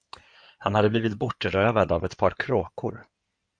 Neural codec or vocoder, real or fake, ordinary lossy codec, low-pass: codec, 16 kHz in and 24 kHz out, 2.2 kbps, FireRedTTS-2 codec; fake; MP3, 64 kbps; 9.9 kHz